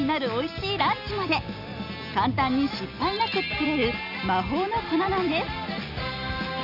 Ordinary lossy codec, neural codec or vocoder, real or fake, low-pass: none; none; real; 5.4 kHz